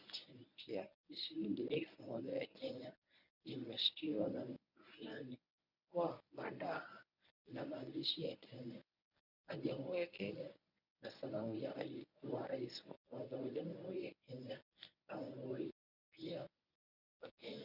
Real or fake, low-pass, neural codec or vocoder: fake; 5.4 kHz; codec, 24 kHz, 0.9 kbps, WavTokenizer, medium speech release version 1